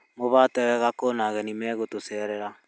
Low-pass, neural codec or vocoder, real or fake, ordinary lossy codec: none; none; real; none